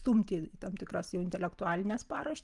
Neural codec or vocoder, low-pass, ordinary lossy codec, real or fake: vocoder, 44.1 kHz, 128 mel bands every 512 samples, BigVGAN v2; 10.8 kHz; Opus, 32 kbps; fake